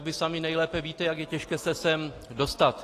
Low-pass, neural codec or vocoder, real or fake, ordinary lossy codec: 14.4 kHz; none; real; AAC, 48 kbps